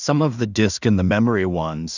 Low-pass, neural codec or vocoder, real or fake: 7.2 kHz; codec, 16 kHz in and 24 kHz out, 0.4 kbps, LongCat-Audio-Codec, two codebook decoder; fake